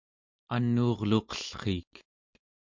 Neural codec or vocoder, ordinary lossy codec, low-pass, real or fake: none; MP3, 64 kbps; 7.2 kHz; real